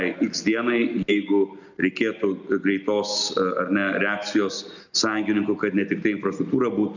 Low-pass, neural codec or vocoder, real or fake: 7.2 kHz; none; real